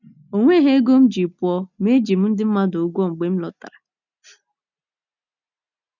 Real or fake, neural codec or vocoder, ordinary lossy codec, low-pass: real; none; none; 7.2 kHz